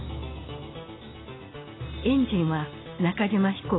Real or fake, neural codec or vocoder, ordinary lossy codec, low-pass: real; none; AAC, 16 kbps; 7.2 kHz